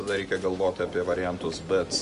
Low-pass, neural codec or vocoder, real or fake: 10.8 kHz; none; real